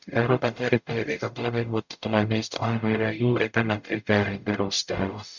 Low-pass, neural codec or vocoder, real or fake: 7.2 kHz; codec, 44.1 kHz, 0.9 kbps, DAC; fake